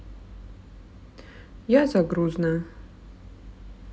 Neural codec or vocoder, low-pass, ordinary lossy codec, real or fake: none; none; none; real